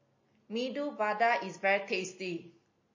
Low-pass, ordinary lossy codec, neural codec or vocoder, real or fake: 7.2 kHz; MP3, 32 kbps; none; real